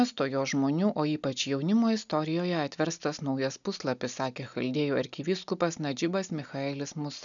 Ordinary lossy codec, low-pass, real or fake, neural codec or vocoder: MP3, 96 kbps; 7.2 kHz; real; none